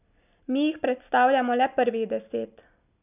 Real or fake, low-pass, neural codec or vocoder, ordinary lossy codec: real; 3.6 kHz; none; none